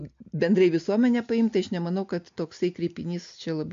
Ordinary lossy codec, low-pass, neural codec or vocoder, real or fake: MP3, 48 kbps; 7.2 kHz; none; real